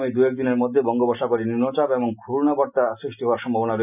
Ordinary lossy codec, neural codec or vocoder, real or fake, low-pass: none; none; real; 3.6 kHz